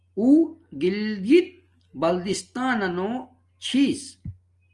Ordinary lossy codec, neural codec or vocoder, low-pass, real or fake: Opus, 32 kbps; none; 10.8 kHz; real